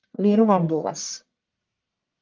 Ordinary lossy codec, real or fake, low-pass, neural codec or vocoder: Opus, 32 kbps; fake; 7.2 kHz; codec, 44.1 kHz, 1.7 kbps, Pupu-Codec